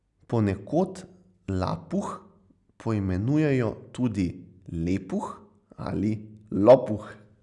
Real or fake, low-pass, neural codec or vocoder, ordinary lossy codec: real; 10.8 kHz; none; none